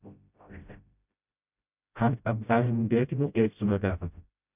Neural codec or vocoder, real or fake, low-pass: codec, 16 kHz, 0.5 kbps, FreqCodec, smaller model; fake; 3.6 kHz